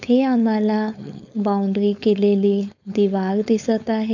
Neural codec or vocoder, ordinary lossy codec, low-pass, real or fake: codec, 16 kHz, 4.8 kbps, FACodec; none; 7.2 kHz; fake